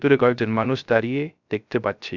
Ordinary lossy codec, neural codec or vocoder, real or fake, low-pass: none; codec, 16 kHz, 0.3 kbps, FocalCodec; fake; 7.2 kHz